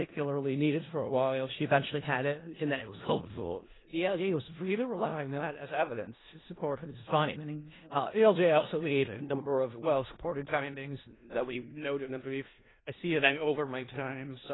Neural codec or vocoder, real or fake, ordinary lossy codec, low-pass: codec, 16 kHz in and 24 kHz out, 0.4 kbps, LongCat-Audio-Codec, four codebook decoder; fake; AAC, 16 kbps; 7.2 kHz